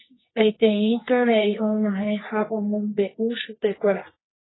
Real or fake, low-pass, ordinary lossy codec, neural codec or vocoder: fake; 7.2 kHz; AAC, 16 kbps; codec, 24 kHz, 0.9 kbps, WavTokenizer, medium music audio release